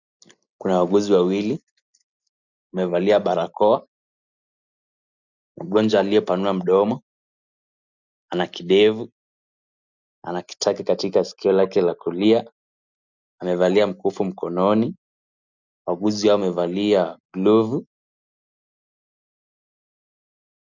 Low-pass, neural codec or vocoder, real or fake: 7.2 kHz; none; real